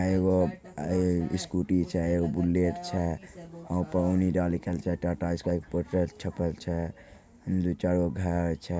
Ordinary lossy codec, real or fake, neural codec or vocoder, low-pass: none; real; none; none